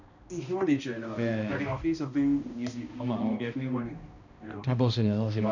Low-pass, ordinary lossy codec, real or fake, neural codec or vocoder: 7.2 kHz; none; fake; codec, 16 kHz, 1 kbps, X-Codec, HuBERT features, trained on balanced general audio